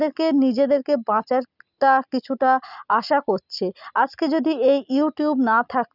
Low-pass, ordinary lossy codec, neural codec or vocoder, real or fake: 5.4 kHz; none; none; real